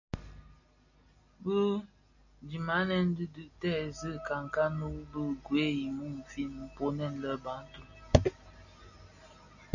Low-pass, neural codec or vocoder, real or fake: 7.2 kHz; none; real